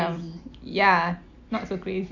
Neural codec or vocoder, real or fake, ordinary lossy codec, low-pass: none; real; none; 7.2 kHz